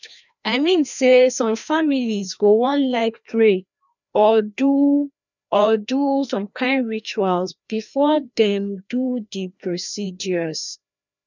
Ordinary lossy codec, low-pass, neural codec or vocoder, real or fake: none; 7.2 kHz; codec, 16 kHz, 1 kbps, FreqCodec, larger model; fake